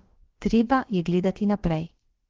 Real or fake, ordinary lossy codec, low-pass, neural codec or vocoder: fake; Opus, 16 kbps; 7.2 kHz; codec, 16 kHz, about 1 kbps, DyCAST, with the encoder's durations